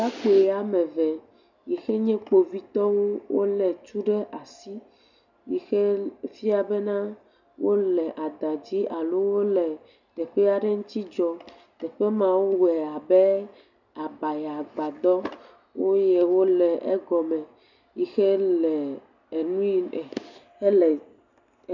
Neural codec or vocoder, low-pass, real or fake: none; 7.2 kHz; real